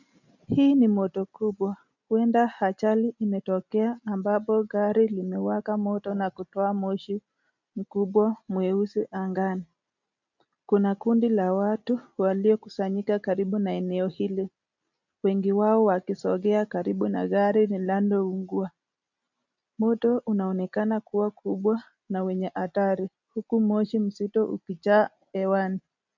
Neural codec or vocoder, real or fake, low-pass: none; real; 7.2 kHz